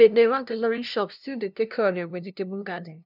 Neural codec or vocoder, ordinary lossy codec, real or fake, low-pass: codec, 16 kHz, 0.5 kbps, FunCodec, trained on LibriTTS, 25 frames a second; none; fake; 5.4 kHz